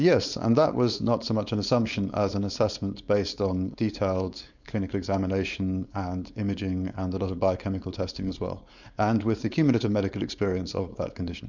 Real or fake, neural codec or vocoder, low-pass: fake; codec, 16 kHz, 4.8 kbps, FACodec; 7.2 kHz